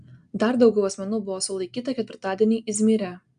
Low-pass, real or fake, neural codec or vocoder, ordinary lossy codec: 9.9 kHz; real; none; AAC, 96 kbps